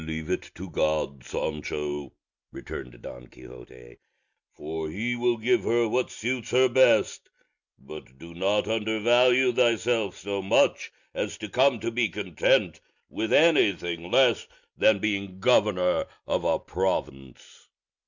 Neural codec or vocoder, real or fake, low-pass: none; real; 7.2 kHz